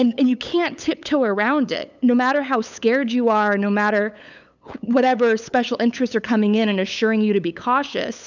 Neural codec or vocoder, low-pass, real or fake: codec, 16 kHz, 8 kbps, FunCodec, trained on LibriTTS, 25 frames a second; 7.2 kHz; fake